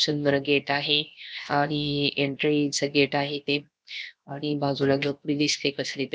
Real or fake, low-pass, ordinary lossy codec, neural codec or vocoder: fake; none; none; codec, 16 kHz, 0.7 kbps, FocalCodec